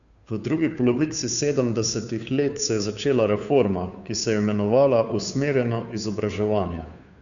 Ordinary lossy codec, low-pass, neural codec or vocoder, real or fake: none; 7.2 kHz; codec, 16 kHz, 2 kbps, FunCodec, trained on Chinese and English, 25 frames a second; fake